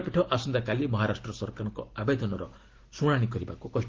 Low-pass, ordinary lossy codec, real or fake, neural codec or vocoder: 7.2 kHz; Opus, 16 kbps; real; none